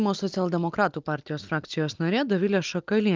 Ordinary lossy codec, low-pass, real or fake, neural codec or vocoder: Opus, 32 kbps; 7.2 kHz; real; none